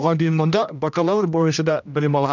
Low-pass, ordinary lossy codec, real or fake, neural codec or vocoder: 7.2 kHz; none; fake; codec, 16 kHz, 1 kbps, X-Codec, HuBERT features, trained on general audio